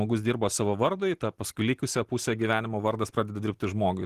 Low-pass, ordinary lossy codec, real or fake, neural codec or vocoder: 14.4 kHz; Opus, 16 kbps; real; none